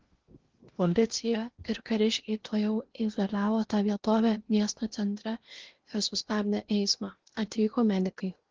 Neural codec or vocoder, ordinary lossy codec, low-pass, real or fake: codec, 16 kHz in and 24 kHz out, 0.8 kbps, FocalCodec, streaming, 65536 codes; Opus, 16 kbps; 7.2 kHz; fake